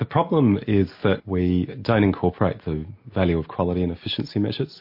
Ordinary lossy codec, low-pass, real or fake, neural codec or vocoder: MP3, 32 kbps; 5.4 kHz; real; none